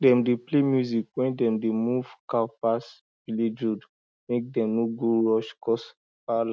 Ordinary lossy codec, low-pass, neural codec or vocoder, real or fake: none; none; none; real